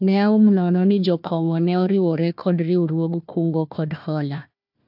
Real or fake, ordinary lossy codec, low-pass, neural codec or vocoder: fake; AAC, 48 kbps; 5.4 kHz; codec, 16 kHz, 1 kbps, FunCodec, trained on Chinese and English, 50 frames a second